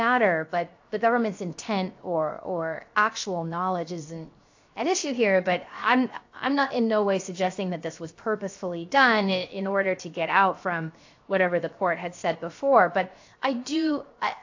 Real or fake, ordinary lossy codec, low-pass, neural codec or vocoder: fake; AAC, 48 kbps; 7.2 kHz; codec, 16 kHz, 0.7 kbps, FocalCodec